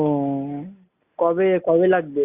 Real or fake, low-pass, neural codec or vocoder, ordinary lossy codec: real; 3.6 kHz; none; Opus, 64 kbps